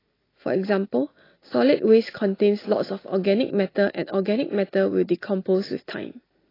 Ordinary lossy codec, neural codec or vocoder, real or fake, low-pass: AAC, 24 kbps; none; real; 5.4 kHz